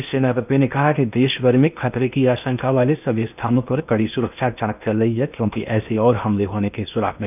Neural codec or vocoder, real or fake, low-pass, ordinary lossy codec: codec, 16 kHz in and 24 kHz out, 0.8 kbps, FocalCodec, streaming, 65536 codes; fake; 3.6 kHz; none